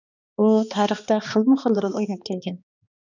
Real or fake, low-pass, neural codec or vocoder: fake; 7.2 kHz; codec, 16 kHz, 2 kbps, X-Codec, HuBERT features, trained on balanced general audio